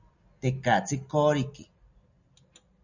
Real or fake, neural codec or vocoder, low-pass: real; none; 7.2 kHz